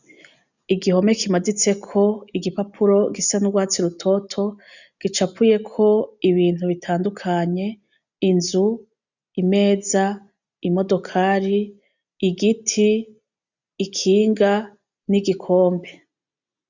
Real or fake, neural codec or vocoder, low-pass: real; none; 7.2 kHz